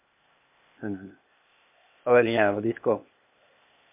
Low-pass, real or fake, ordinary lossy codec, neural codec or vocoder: 3.6 kHz; fake; MP3, 32 kbps; codec, 16 kHz, 0.8 kbps, ZipCodec